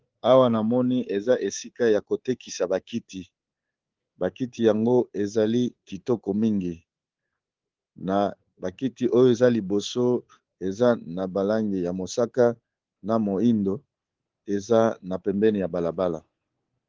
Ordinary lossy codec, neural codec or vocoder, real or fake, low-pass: Opus, 16 kbps; codec, 24 kHz, 3.1 kbps, DualCodec; fake; 7.2 kHz